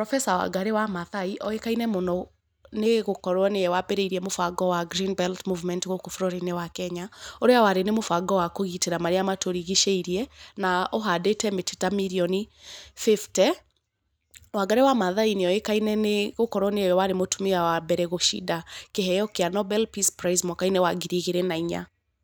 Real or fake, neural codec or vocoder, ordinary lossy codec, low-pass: real; none; none; none